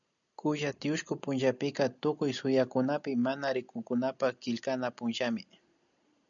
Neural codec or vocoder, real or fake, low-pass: none; real; 7.2 kHz